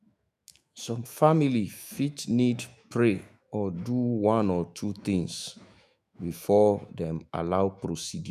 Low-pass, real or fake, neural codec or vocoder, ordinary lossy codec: 14.4 kHz; fake; autoencoder, 48 kHz, 128 numbers a frame, DAC-VAE, trained on Japanese speech; none